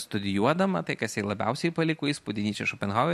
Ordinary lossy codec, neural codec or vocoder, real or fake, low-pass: MP3, 64 kbps; none; real; 14.4 kHz